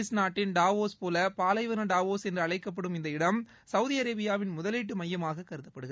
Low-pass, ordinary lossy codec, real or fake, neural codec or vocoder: none; none; real; none